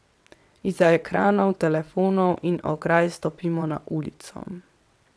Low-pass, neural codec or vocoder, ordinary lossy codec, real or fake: none; vocoder, 22.05 kHz, 80 mel bands, WaveNeXt; none; fake